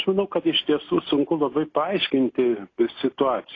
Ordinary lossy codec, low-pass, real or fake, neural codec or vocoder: AAC, 32 kbps; 7.2 kHz; real; none